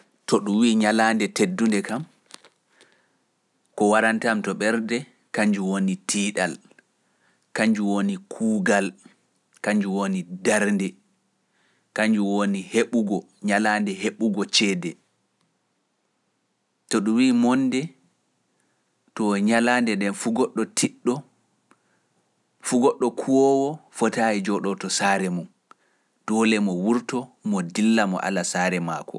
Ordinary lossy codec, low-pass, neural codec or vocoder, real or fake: none; none; none; real